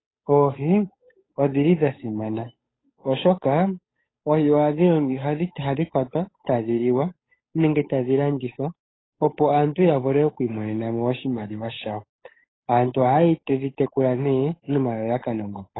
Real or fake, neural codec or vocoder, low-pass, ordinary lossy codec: fake; codec, 16 kHz, 8 kbps, FunCodec, trained on Chinese and English, 25 frames a second; 7.2 kHz; AAC, 16 kbps